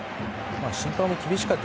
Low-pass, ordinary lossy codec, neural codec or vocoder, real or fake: none; none; none; real